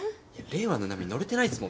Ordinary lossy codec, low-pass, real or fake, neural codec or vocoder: none; none; real; none